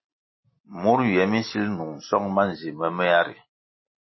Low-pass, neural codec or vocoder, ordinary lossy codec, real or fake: 7.2 kHz; none; MP3, 24 kbps; real